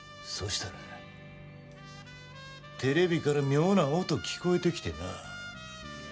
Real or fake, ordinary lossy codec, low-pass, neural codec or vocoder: real; none; none; none